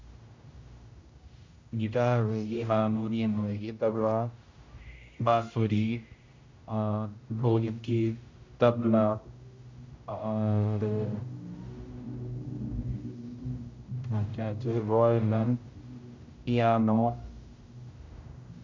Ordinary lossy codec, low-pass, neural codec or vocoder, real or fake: MP3, 48 kbps; 7.2 kHz; codec, 16 kHz, 0.5 kbps, X-Codec, HuBERT features, trained on general audio; fake